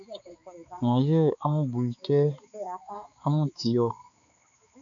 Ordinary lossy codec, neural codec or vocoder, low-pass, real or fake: MP3, 64 kbps; codec, 16 kHz, 4 kbps, X-Codec, HuBERT features, trained on balanced general audio; 7.2 kHz; fake